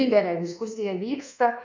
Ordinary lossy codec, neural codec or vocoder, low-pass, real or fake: MP3, 64 kbps; codec, 24 kHz, 1.2 kbps, DualCodec; 7.2 kHz; fake